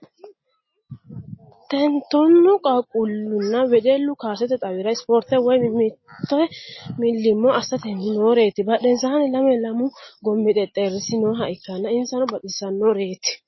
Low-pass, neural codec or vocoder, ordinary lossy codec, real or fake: 7.2 kHz; none; MP3, 24 kbps; real